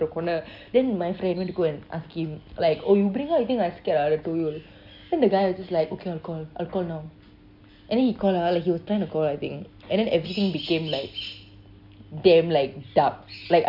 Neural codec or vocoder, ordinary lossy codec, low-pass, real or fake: none; none; 5.4 kHz; real